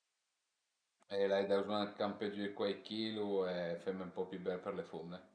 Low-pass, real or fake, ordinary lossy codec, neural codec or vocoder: 9.9 kHz; real; none; none